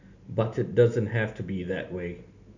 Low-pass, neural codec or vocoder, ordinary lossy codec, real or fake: 7.2 kHz; none; none; real